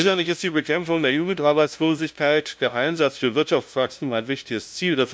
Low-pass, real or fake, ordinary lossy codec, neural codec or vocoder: none; fake; none; codec, 16 kHz, 0.5 kbps, FunCodec, trained on LibriTTS, 25 frames a second